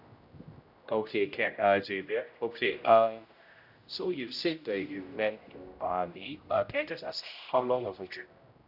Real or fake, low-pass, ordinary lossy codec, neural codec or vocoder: fake; 5.4 kHz; none; codec, 16 kHz, 0.5 kbps, X-Codec, HuBERT features, trained on general audio